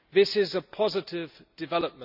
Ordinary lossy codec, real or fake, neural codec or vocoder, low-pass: none; real; none; 5.4 kHz